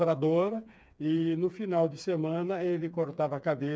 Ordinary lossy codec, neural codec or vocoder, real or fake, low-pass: none; codec, 16 kHz, 4 kbps, FreqCodec, smaller model; fake; none